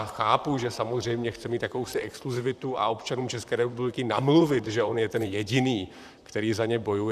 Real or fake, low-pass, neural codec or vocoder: fake; 14.4 kHz; vocoder, 44.1 kHz, 128 mel bands, Pupu-Vocoder